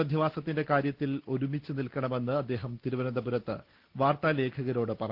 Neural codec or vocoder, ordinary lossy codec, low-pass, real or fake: none; Opus, 16 kbps; 5.4 kHz; real